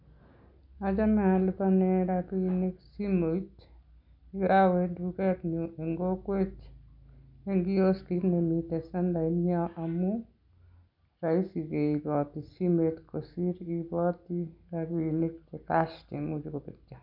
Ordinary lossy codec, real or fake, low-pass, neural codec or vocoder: none; real; 5.4 kHz; none